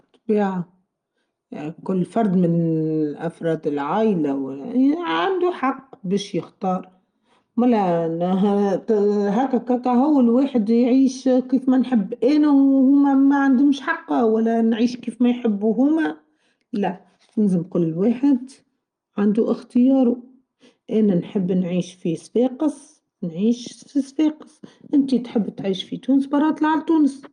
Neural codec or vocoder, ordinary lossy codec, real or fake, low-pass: none; Opus, 32 kbps; real; 9.9 kHz